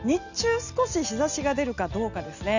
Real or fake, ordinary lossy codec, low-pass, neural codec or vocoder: real; none; 7.2 kHz; none